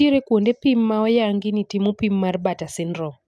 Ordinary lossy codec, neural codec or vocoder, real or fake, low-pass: none; none; real; none